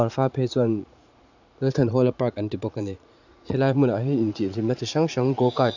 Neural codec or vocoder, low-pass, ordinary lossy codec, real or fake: vocoder, 44.1 kHz, 80 mel bands, Vocos; 7.2 kHz; none; fake